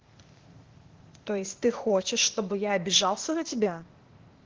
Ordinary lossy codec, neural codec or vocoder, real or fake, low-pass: Opus, 16 kbps; codec, 16 kHz, 0.8 kbps, ZipCodec; fake; 7.2 kHz